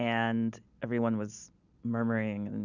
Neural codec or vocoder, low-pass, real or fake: none; 7.2 kHz; real